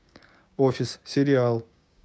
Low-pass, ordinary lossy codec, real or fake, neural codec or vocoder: none; none; fake; codec, 16 kHz, 6 kbps, DAC